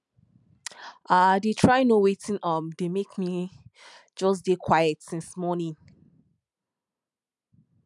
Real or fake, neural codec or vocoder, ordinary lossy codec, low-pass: real; none; none; 10.8 kHz